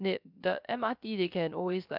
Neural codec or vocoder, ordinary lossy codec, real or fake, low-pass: codec, 16 kHz, 0.3 kbps, FocalCodec; none; fake; 5.4 kHz